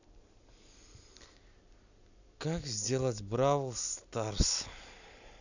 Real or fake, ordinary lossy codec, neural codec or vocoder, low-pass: real; none; none; 7.2 kHz